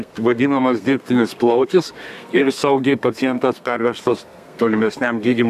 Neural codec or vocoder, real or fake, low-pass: codec, 32 kHz, 1.9 kbps, SNAC; fake; 14.4 kHz